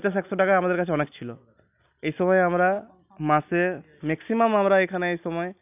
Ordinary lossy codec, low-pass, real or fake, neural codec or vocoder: none; 3.6 kHz; real; none